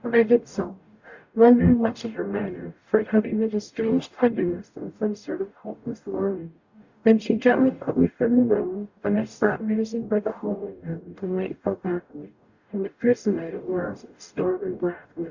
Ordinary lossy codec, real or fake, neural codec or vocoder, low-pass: Opus, 64 kbps; fake; codec, 44.1 kHz, 0.9 kbps, DAC; 7.2 kHz